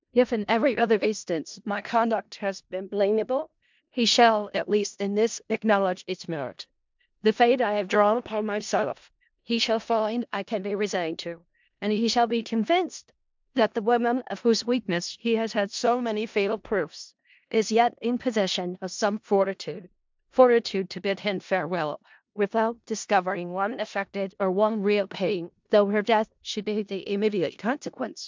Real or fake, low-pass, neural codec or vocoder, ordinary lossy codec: fake; 7.2 kHz; codec, 16 kHz in and 24 kHz out, 0.4 kbps, LongCat-Audio-Codec, four codebook decoder; MP3, 64 kbps